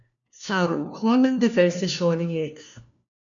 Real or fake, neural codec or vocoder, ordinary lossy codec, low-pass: fake; codec, 16 kHz, 1 kbps, FunCodec, trained on LibriTTS, 50 frames a second; MP3, 96 kbps; 7.2 kHz